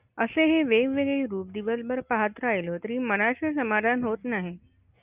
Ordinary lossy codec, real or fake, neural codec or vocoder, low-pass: Opus, 64 kbps; real; none; 3.6 kHz